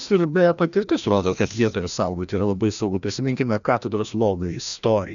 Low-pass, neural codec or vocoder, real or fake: 7.2 kHz; codec, 16 kHz, 1 kbps, FreqCodec, larger model; fake